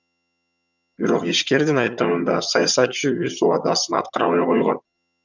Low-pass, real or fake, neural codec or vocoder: 7.2 kHz; fake; vocoder, 22.05 kHz, 80 mel bands, HiFi-GAN